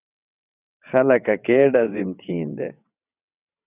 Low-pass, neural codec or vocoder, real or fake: 3.6 kHz; vocoder, 22.05 kHz, 80 mel bands, WaveNeXt; fake